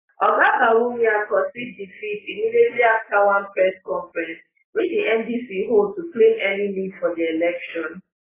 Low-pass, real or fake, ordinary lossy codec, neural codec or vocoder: 3.6 kHz; real; AAC, 16 kbps; none